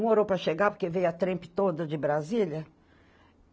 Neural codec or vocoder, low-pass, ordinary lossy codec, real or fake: none; none; none; real